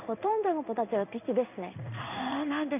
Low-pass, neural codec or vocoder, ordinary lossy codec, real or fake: 3.6 kHz; codec, 16 kHz in and 24 kHz out, 1 kbps, XY-Tokenizer; none; fake